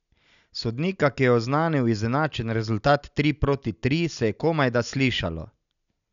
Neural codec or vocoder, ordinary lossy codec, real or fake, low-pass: none; none; real; 7.2 kHz